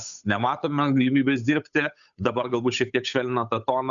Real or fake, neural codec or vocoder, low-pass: fake; codec, 16 kHz, 8 kbps, FunCodec, trained on Chinese and English, 25 frames a second; 7.2 kHz